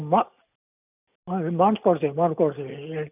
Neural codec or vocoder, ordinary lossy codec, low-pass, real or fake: none; none; 3.6 kHz; real